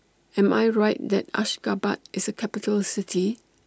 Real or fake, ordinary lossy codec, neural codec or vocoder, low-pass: real; none; none; none